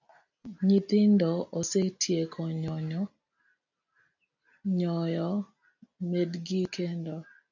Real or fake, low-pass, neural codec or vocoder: real; 7.2 kHz; none